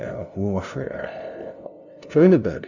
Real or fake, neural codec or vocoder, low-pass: fake; codec, 16 kHz, 0.5 kbps, FunCodec, trained on LibriTTS, 25 frames a second; 7.2 kHz